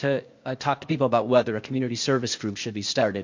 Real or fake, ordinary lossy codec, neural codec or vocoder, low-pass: fake; MP3, 48 kbps; codec, 16 kHz, 0.8 kbps, ZipCodec; 7.2 kHz